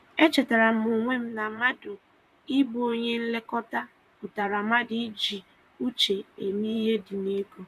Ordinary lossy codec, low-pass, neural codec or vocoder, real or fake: none; 14.4 kHz; vocoder, 44.1 kHz, 128 mel bands, Pupu-Vocoder; fake